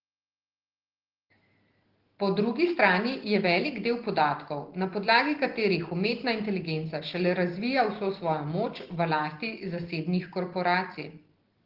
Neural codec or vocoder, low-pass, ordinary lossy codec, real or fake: none; 5.4 kHz; Opus, 16 kbps; real